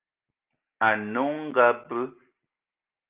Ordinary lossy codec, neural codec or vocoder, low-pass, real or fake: Opus, 32 kbps; none; 3.6 kHz; real